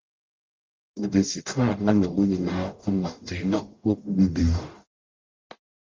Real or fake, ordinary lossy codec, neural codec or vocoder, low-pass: fake; Opus, 32 kbps; codec, 44.1 kHz, 0.9 kbps, DAC; 7.2 kHz